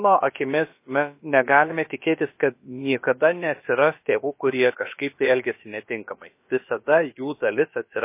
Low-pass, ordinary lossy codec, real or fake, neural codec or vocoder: 3.6 kHz; MP3, 24 kbps; fake; codec, 16 kHz, about 1 kbps, DyCAST, with the encoder's durations